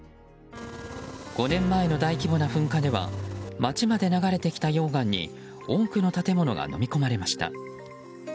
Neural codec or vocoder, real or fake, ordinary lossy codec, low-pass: none; real; none; none